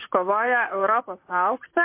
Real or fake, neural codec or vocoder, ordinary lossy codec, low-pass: fake; vocoder, 44.1 kHz, 128 mel bands, Pupu-Vocoder; MP3, 24 kbps; 3.6 kHz